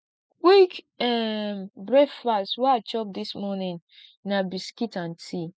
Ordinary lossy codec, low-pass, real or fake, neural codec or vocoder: none; none; real; none